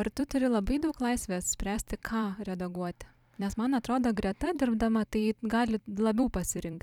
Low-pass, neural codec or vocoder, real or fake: 19.8 kHz; vocoder, 44.1 kHz, 128 mel bands every 256 samples, BigVGAN v2; fake